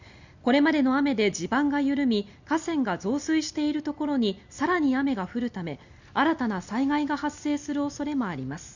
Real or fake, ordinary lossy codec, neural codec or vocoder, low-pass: real; Opus, 64 kbps; none; 7.2 kHz